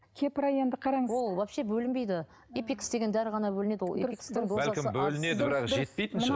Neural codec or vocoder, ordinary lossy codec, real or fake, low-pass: none; none; real; none